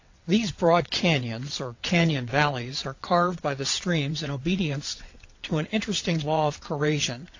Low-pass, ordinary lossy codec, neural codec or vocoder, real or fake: 7.2 kHz; AAC, 48 kbps; vocoder, 22.05 kHz, 80 mel bands, WaveNeXt; fake